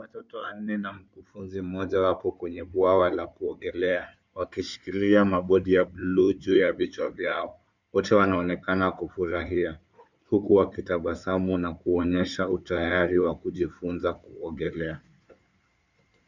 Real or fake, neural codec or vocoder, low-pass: fake; codec, 16 kHz in and 24 kHz out, 2.2 kbps, FireRedTTS-2 codec; 7.2 kHz